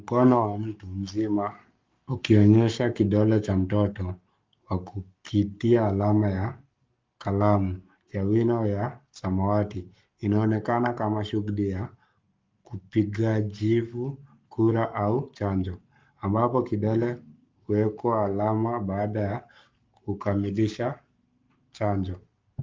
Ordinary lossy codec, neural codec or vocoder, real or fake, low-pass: Opus, 24 kbps; codec, 44.1 kHz, 7.8 kbps, Pupu-Codec; fake; 7.2 kHz